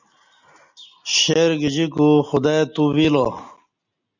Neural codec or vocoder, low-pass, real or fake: none; 7.2 kHz; real